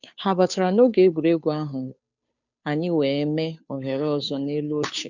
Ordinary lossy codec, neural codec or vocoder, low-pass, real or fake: none; codec, 16 kHz, 2 kbps, FunCodec, trained on Chinese and English, 25 frames a second; 7.2 kHz; fake